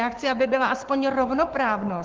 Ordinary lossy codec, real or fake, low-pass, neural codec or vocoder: Opus, 16 kbps; fake; 7.2 kHz; codec, 44.1 kHz, 7.8 kbps, Pupu-Codec